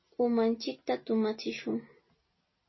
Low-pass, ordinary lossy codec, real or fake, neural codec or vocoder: 7.2 kHz; MP3, 24 kbps; real; none